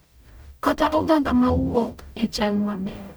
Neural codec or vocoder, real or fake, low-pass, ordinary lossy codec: codec, 44.1 kHz, 0.9 kbps, DAC; fake; none; none